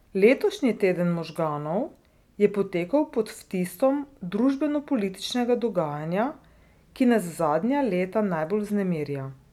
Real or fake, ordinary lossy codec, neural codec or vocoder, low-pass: real; none; none; 19.8 kHz